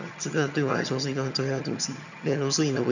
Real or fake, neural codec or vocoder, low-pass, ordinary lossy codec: fake; vocoder, 22.05 kHz, 80 mel bands, HiFi-GAN; 7.2 kHz; none